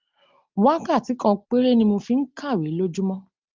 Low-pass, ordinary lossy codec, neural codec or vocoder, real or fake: 7.2 kHz; Opus, 32 kbps; none; real